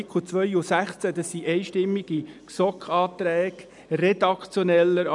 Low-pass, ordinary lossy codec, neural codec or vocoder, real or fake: 10.8 kHz; none; none; real